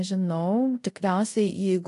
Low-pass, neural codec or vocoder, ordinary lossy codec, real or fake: 10.8 kHz; codec, 24 kHz, 0.5 kbps, DualCodec; AAC, 48 kbps; fake